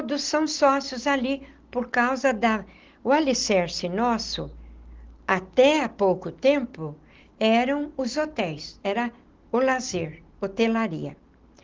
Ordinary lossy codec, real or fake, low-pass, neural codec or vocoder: Opus, 16 kbps; real; 7.2 kHz; none